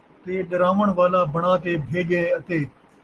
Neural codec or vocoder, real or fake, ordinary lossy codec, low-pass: none; real; Opus, 16 kbps; 10.8 kHz